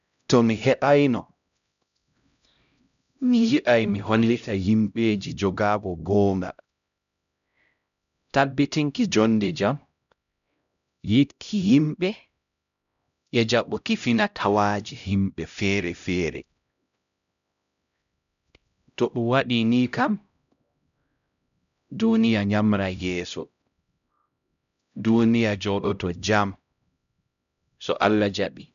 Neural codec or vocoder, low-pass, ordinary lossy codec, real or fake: codec, 16 kHz, 0.5 kbps, X-Codec, HuBERT features, trained on LibriSpeech; 7.2 kHz; none; fake